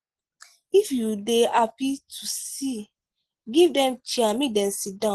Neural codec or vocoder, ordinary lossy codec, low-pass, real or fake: none; Opus, 16 kbps; 10.8 kHz; real